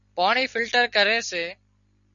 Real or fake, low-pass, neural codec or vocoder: real; 7.2 kHz; none